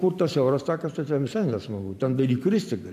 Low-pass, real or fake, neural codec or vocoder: 14.4 kHz; fake; vocoder, 44.1 kHz, 128 mel bands every 512 samples, BigVGAN v2